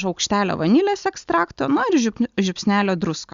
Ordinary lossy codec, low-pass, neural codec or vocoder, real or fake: Opus, 64 kbps; 7.2 kHz; none; real